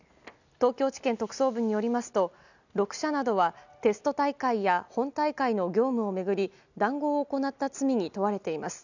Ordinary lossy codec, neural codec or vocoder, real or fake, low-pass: none; none; real; 7.2 kHz